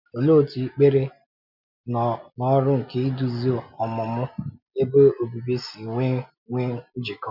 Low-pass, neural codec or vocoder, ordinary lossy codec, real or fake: 5.4 kHz; none; none; real